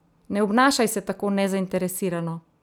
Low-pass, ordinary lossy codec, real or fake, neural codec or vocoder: none; none; real; none